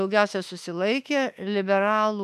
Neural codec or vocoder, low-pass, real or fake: autoencoder, 48 kHz, 32 numbers a frame, DAC-VAE, trained on Japanese speech; 14.4 kHz; fake